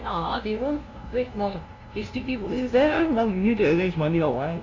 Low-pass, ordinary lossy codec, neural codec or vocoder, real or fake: 7.2 kHz; AAC, 32 kbps; codec, 16 kHz, 0.5 kbps, FunCodec, trained on LibriTTS, 25 frames a second; fake